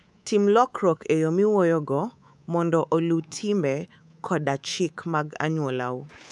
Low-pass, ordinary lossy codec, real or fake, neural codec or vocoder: none; none; fake; codec, 24 kHz, 3.1 kbps, DualCodec